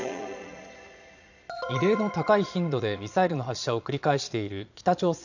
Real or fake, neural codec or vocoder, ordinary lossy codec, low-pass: fake; vocoder, 22.05 kHz, 80 mel bands, Vocos; none; 7.2 kHz